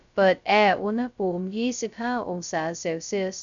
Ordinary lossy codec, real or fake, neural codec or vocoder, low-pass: none; fake; codec, 16 kHz, 0.2 kbps, FocalCodec; 7.2 kHz